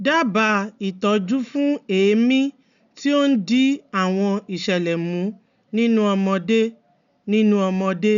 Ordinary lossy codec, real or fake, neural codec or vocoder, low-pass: none; real; none; 7.2 kHz